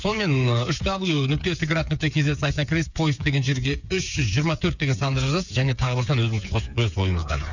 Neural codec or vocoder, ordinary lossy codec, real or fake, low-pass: codec, 16 kHz, 8 kbps, FreqCodec, smaller model; none; fake; 7.2 kHz